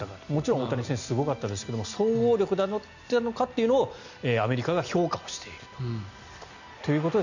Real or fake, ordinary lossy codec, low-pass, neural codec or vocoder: real; none; 7.2 kHz; none